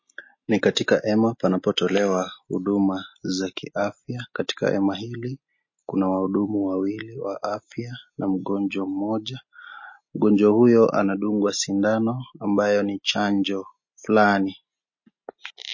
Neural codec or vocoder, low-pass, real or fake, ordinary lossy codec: none; 7.2 kHz; real; MP3, 32 kbps